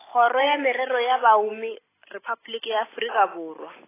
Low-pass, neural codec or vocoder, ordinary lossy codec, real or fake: 3.6 kHz; none; AAC, 16 kbps; real